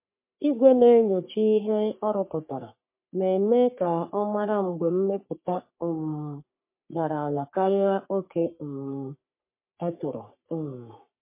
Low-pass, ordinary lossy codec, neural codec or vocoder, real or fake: 3.6 kHz; MP3, 24 kbps; codec, 44.1 kHz, 3.4 kbps, Pupu-Codec; fake